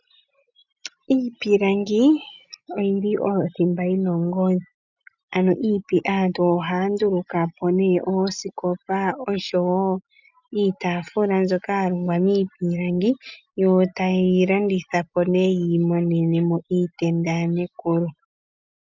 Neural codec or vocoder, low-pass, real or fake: none; 7.2 kHz; real